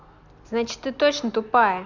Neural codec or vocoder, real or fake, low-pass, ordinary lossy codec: none; real; 7.2 kHz; none